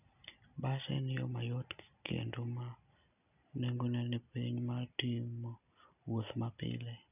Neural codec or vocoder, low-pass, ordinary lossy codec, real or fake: none; 3.6 kHz; none; real